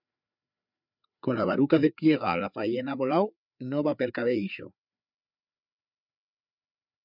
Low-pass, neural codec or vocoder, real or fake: 5.4 kHz; codec, 16 kHz, 4 kbps, FreqCodec, larger model; fake